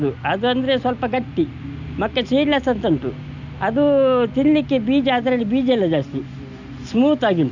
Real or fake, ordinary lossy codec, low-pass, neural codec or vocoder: real; none; 7.2 kHz; none